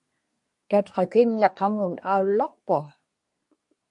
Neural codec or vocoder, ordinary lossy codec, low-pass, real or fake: codec, 24 kHz, 1 kbps, SNAC; MP3, 48 kbps; 10.8 kHz; fake